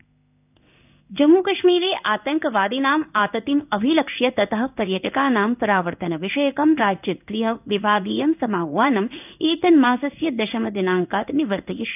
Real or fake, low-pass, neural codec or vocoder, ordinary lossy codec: fake; 3.6 kHz; codec, 16 kHz in and 24 kHz out, 1 kbps, XY-Tokenizer; none